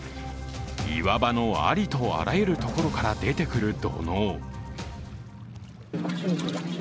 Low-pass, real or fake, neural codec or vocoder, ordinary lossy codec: none; real; none; none